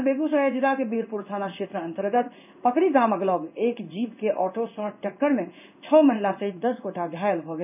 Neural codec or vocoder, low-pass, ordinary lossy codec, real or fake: codec, 16 kHz in and 24 kHz out, 1 kbps, XY-Tokenizer; 3.6 kHz; none; fake